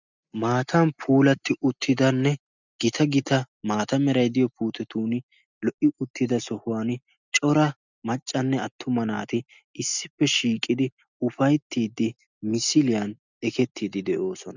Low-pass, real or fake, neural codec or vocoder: 7.2 kHz; real; none